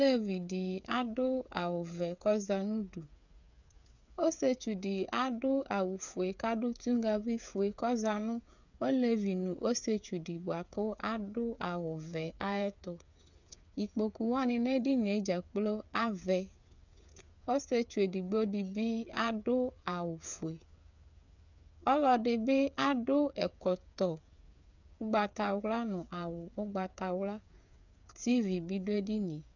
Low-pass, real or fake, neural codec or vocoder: 7.2 kHz; fake; codec, 16 kHz, 8 kbps, FreqCodec, smaller model